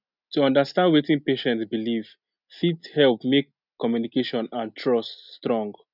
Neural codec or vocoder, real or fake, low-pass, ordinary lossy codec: none; real; 5.4 kHz; none